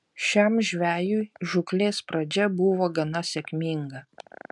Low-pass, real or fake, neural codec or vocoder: 10.8 kHz; real; none